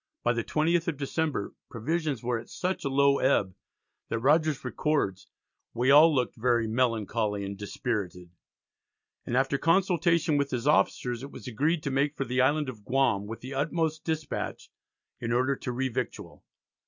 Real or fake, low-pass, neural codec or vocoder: real; 7.2 kHz; none